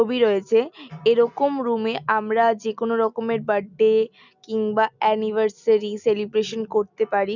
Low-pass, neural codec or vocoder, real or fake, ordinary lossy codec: 7.2 kHz; none; real; none